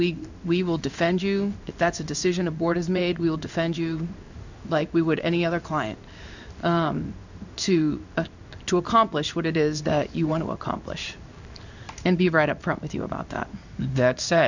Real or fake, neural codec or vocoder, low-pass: fake; codec, 16 kHz in and 24 kHz out, 1 kbps, XY-Tokenizer; 7.2 kHz